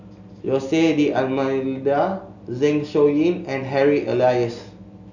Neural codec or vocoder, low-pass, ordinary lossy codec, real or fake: none; 7.2 kHz; none; real